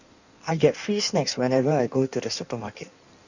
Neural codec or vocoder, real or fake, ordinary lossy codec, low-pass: codec, 16 kHz in and 24 kHz out, 1.1 kbps, FireRedTTS-2 codec; fake; none; 7.2 kHz